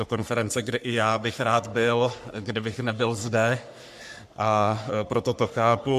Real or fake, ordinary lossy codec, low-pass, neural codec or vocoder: fake; MP3, 96 kbps; 14.4 kHz; codec, 44.1 kHz, 3.4 kbps, Pupu-Codec